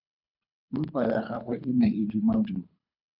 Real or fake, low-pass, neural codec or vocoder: fake; 5.4 kHz; codec, 24 kHz, 3 kbps, HILCodec